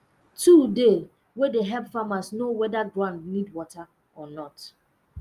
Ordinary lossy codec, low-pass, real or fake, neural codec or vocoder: Opus, 32 kbps; 14.4 kHz; real; none